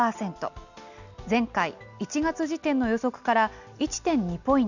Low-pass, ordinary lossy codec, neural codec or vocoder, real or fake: 7.2 kHz; none; none; real